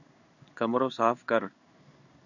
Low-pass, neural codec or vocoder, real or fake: 7.2 kHz; codec, 16 kHz in and 24 kHz out, 1 kbps, XY-Tokenizer; fake